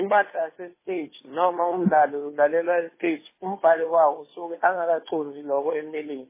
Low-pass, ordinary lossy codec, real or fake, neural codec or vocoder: 3.6 kHz; MP3, 16 kbps; fake; codec, 16 kHz, 2 kbps, FunCodec, trained on Chinese and English, 25 frames a second